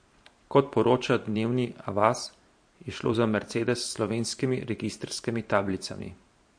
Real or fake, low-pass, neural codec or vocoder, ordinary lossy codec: fake; 9.9 kHz; vocoder, 44.1 kHz, 128 mel bands every 512 samples, BigVGAN v2; MP3, 48 kbps